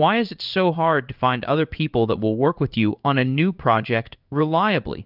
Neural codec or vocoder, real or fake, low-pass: codec, 16 kHz in and 24 kHz out, 1 kbps, XY-Tokenizer; fake; 5.4 kHz